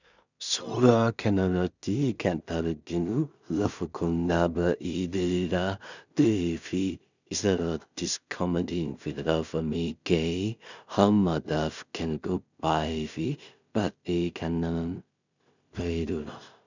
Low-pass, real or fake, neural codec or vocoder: 7.2 kHz; fake; codec, 16 kHz in and 24 kHz out, 0.4 kbps, LongCat-Audio-Codec, two codebook decoder